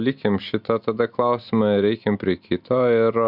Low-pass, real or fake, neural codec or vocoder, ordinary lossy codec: 5.4 kHz; real; none; Opus, 64 kbps